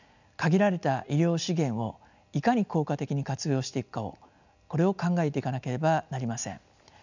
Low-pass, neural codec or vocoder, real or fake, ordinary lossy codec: 7.2 kHz; none; real; none